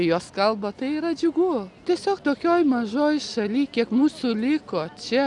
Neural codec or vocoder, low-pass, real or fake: none; 10.8 kHz; real